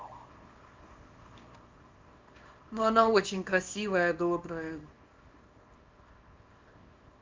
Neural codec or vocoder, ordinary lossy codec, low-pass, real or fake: codec, 24 kHz, 0.9 kbps, WavTokenizer, small release; Opus, 24 kbps; 7.2 kHz; fake